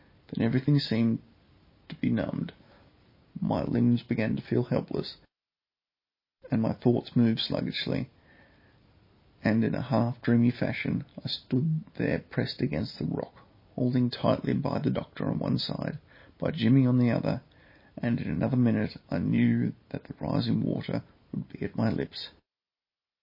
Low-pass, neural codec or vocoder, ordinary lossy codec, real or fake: 5.4 kHz; none; MP3, 24 kbps; real